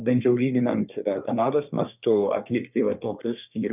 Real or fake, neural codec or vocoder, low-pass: fake; codec, 24 kHz, 1 kbps, SNAC; 3.6 kHz